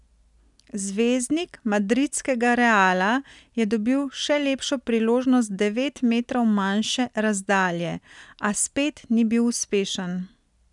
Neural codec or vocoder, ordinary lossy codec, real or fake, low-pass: none; none; real; 10.8 kHz